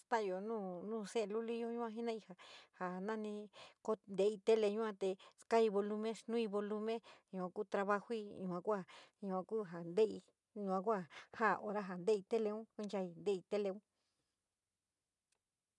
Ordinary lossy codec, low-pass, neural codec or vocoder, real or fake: none; 10.8 kHz; none; real